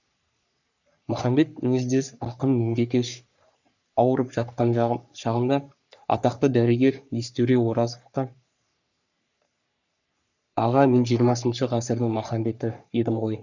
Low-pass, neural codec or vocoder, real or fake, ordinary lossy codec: 7.2 kHz; codec, 44.1 kHz, 3.4 kbps, Pupu-Codec; fake; none